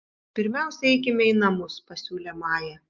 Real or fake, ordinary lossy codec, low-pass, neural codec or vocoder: real; Opus, 24 kbps; 7.2 kHz; none